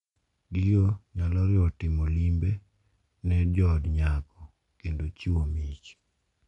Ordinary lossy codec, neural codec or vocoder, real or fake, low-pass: none; none; real; 10.8 kHz